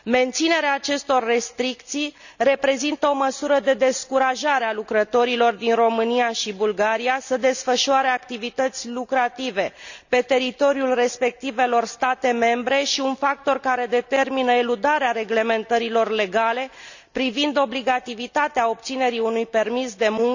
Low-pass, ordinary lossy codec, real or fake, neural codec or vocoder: 7.2 kHz; none; real; none